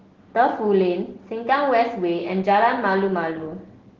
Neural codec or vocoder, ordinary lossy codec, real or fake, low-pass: none; Opus, 16 kbps; real; 7.2 kHz